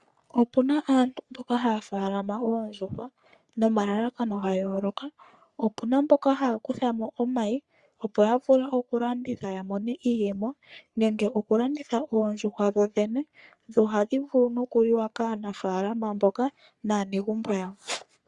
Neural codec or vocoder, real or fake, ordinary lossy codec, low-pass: codec, 44.1 kHz, 3.4 kbps, Pupu-Codec; fake; Opus, 64 kbps; 10.8 kHz